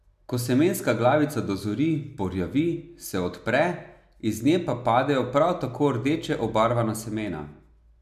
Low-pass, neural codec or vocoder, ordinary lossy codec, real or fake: 14.4 kHz; none; none; real